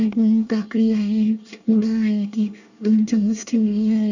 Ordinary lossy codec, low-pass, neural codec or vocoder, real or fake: none; 7.2 kHz; codec, 24 kHz, 1 kbps, SNAC; fake